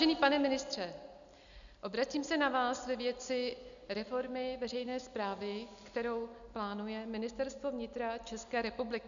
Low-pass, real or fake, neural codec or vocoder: 7.2 kHz; real; none